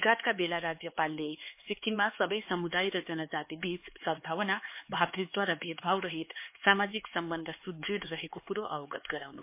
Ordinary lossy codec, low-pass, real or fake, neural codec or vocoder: MP3, 24 kbps; 3.6 kHz; fake; codec, 16 kHz, 4 kbps, X-Codec, HuBERT features, trained on LibriSpeech